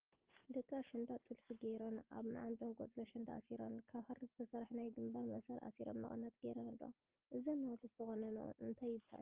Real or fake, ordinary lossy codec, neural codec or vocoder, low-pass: real; Opus, 16 kbps; none; 3.6 kHz